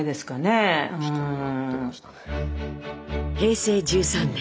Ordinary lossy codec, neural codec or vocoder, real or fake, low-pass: none; none; real; none